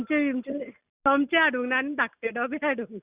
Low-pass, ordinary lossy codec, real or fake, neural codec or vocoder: 3.6 kHz; Opus, 64 kbps; real; none